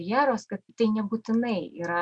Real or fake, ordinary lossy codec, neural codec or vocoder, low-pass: real; Opus, 64 kbps; none; 9.9 kHz